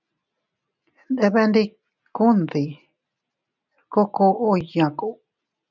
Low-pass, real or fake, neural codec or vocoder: 7.2 kHz; real; none